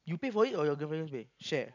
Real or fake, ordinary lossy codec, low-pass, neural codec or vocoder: real; none; 7.2 kHz; none